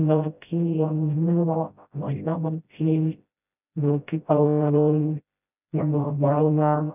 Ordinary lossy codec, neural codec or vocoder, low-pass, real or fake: none; codec, 16 kHz, 0.5 kbps, FreqCodec, smaller model; 3.6 kHz; fake